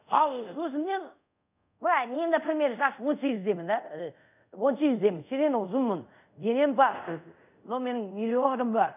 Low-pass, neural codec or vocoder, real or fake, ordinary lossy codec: 3.6 kHz; codec, 24 kHz, 0.5 kbps, DualCodec; fake; none